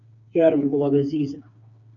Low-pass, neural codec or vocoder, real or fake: 7.2 kHz; codec, 16 kHz, 4 kbps, FreqCodec, smaller model; fake